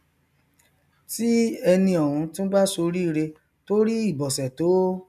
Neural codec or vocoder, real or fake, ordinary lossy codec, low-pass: none; real; none; 14.4 kHz